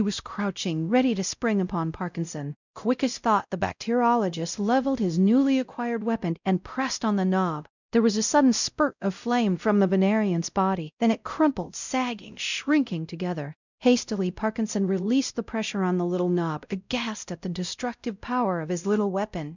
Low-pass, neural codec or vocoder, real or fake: 7.2 kHz; codec, 16 kHz, 0.5 kbps, X-Codec, WavLM features, trained on Multilingual LibriSpeech; fake